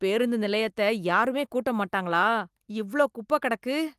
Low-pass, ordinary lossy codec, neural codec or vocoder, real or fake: 14.4 kHz; Opus, 32 kbps; none; real